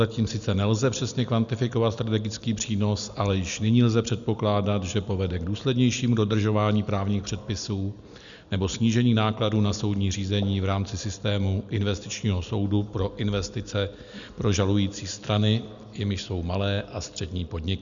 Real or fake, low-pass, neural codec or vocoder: real; 7.2 kHz; none